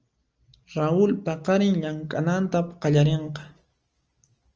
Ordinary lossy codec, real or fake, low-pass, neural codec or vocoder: Opus, 24 kbps; real; 7.2 kHz; none